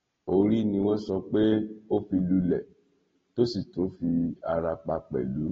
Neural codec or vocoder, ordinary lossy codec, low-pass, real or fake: none; AAC, 24 kbps; 7.2 kHz; real